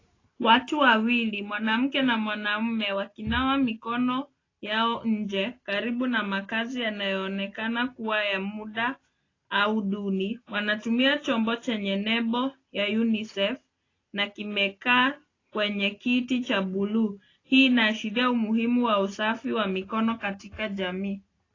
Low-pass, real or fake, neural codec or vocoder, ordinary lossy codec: 7.2 kHz; real; none; AAC, 32 kbps